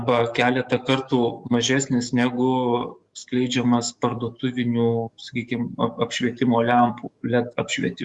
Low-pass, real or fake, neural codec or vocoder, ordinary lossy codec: 10.8 kHz; fake; codec, 44.1 kHz, 7.8 kbps, DAC; Opus, 64 kbps